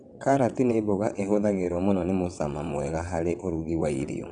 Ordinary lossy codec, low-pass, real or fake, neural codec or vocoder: none; 9.9 kHz; fake; vocoder, 22.05 kHz, 80 mel bands, WaveNeXt